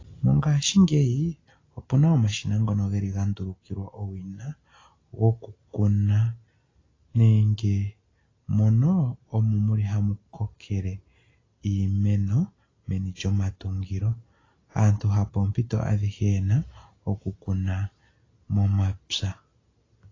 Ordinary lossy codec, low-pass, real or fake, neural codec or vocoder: AAC, 32 kbps; 7.2 kHz; real; none